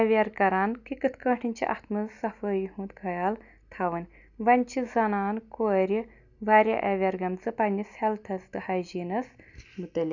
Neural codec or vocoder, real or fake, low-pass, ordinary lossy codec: none; real; 7.2 kHz; none